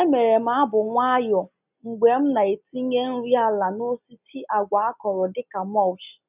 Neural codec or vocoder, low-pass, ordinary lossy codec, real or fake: none; 3.6 kHz; none; real